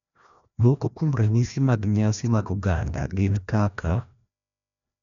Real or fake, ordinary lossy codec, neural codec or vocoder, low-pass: fake; none; codec, 16 kHz, 1 kbps, FreqCodec, larger model; 7.2 kHz